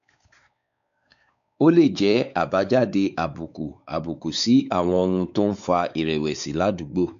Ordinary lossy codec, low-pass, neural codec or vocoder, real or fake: none; 7.2 kHz; codec, 16 kHz, 4 kbps, X-Codec, WavLM features, trained on Multilingual LibriSpeech; fake